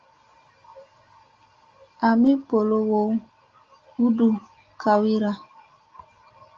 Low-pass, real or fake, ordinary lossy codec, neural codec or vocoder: 7.2 kHz; real; Opus, 32 kbps; none